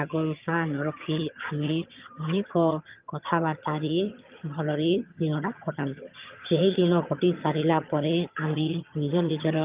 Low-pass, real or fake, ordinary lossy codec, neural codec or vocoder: 3.6 kHz; fake; Opus, 32 kbps; vocoder, 22.05 kHz, 80 mel bands, HiFi-GAN